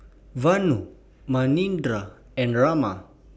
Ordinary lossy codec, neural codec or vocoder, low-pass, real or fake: none; none; none; real